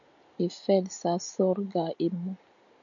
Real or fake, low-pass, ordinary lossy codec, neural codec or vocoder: real; 7.2 kHz; MP3, 96 kbps; none